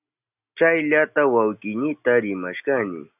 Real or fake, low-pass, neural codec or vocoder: real; 3.6 kHz; none